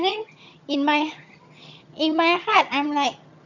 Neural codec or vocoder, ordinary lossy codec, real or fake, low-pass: vocoder, 22.05 kHz, 80 mel bands, HiFi-GAN; none; fake; 7.2 kHz